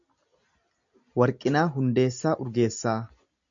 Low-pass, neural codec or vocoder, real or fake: 7.2 kHz; none; real